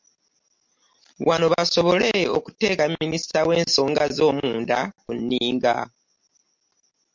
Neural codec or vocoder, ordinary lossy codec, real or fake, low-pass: none; MP3, 48 kbps; real; 7.2 kHz